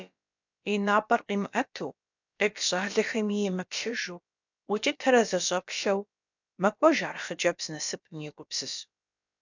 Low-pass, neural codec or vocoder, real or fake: 7.2 kHz; codec, 16 kHz, about 1 kbps, DyCAST, with the encoder's durations; fake